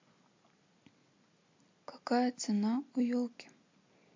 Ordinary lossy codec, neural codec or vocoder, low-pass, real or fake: MP3, 48 kbps; none; 7.2 kHz; real